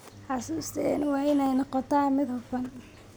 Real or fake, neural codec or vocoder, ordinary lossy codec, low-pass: real; none; none; none